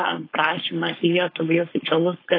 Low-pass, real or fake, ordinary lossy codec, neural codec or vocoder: 5.4 kHz; fake; AAC, 24 kbps; codec, 16 kHz, 4.8 kbps, FACodec